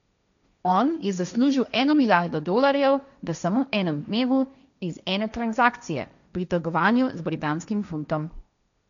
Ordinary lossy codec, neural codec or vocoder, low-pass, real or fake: none; codec, 16 kHz, 1.1 kbps, Voila-Tokenizer; 7.2 kHz; fake